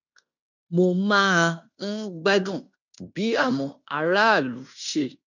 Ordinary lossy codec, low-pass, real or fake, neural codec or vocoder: none; 7.2 kHz; fake; codec, 16 kHz in and 24 kHz out, 0.9 kbps, LongCat-Audio-Codec, fine tuned four codebook decoder